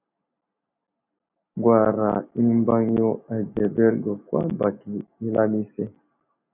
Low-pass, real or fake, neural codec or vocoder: 3.6 kHz; real; none